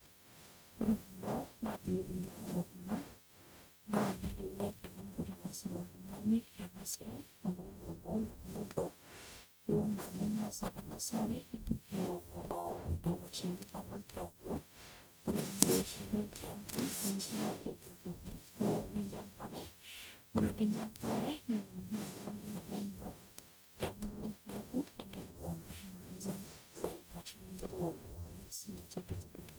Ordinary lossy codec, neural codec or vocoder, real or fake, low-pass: none; codec, 44.1 kHz, 0.9 kbps, DAC; fake; none